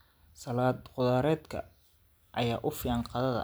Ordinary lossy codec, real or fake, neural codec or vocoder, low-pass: none; real; none; none